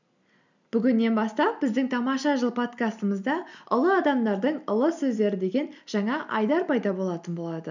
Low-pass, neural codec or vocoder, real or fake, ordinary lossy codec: 7.2 kHz; none; real; none